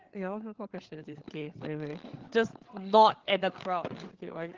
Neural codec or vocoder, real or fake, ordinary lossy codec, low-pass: codec, 16 kHz, 8 kbps, FreqCodec, larger model; fake; Opus, 16 kbps; 7.2 kHz